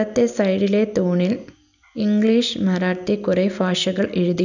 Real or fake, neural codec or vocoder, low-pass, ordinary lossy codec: real; none; 7.2 kHz; none